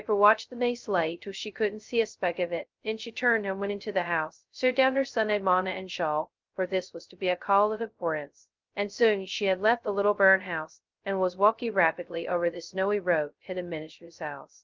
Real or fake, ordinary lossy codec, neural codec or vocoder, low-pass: fake; Opus, 24 kbps; codec, 16 kHz, 0.2 kbps, FocalCodec; 7.2 kHz